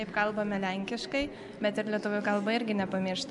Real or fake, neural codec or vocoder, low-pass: real; none; 10.8 kHz